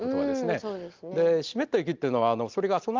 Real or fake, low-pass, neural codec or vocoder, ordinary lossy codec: real; 7.2 kHz; none; Opus, 32 kbps